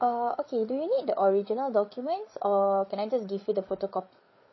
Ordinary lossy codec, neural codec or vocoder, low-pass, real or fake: MP3, 24 kbps; codec, 16 kHz, 8 kbps, FreqCodec, larger model; 7.2 kHz; fake